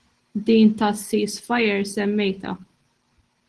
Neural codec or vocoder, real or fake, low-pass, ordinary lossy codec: none; real; 10.8 kHz; Opus, 16 kbps